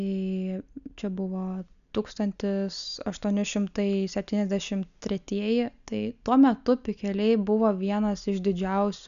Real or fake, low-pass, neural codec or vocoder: real; 7.2 kHz; none